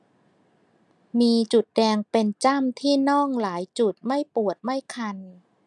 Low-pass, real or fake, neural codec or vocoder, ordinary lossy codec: 10.8 kHz; real; none; none